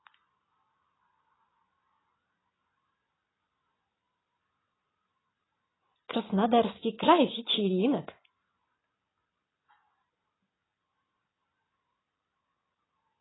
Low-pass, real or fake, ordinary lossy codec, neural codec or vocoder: 7.2 kHz; real; AAC, 16 kbps; none